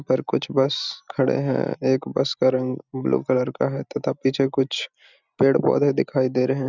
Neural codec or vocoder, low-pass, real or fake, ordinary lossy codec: none; 7.2 kHz; real; none